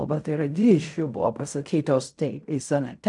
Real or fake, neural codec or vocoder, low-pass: fake; codec, 16 kHz in and 24 kHz out, 0.4 kbps, LongCat-Audio-Codec, fine tuned four codebook decoder; 10.8 kHz